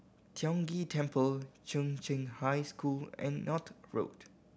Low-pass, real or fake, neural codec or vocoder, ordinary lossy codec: none; real; none; none